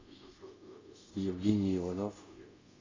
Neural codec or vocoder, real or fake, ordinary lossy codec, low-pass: codec, 24 kHz, 0.5 kbps, DualCodec; fake; AAC, 32 kbps; 7.2 kHz